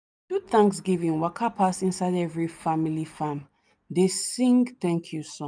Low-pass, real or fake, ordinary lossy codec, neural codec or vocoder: none; real; none; none